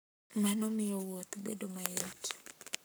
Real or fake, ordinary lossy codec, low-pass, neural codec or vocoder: fake; none; none; codec, 44.1 kHz, 7.8 kbps, Pupu-Codec